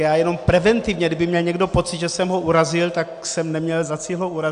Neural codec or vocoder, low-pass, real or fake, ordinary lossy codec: none; 9.9 kHz; real; AAC, 96 kbps